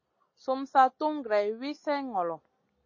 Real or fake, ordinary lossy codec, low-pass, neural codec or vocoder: real; MP3, 32 kbps; 7.2 kHz; none